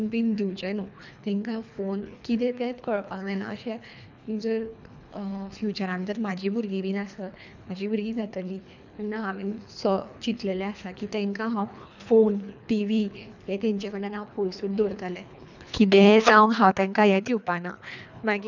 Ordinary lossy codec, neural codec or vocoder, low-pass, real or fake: none; codec, 24 kHz, 3 kbps, HILCodec; 7.2 kHz; fake